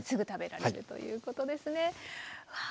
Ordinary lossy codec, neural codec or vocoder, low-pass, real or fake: none; none; none; real